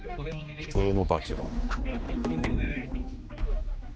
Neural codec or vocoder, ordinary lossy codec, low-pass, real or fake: codec, 16 kHz, 2 kbps, X-Codec, HuBERT features, trained on general audio; none; none; fake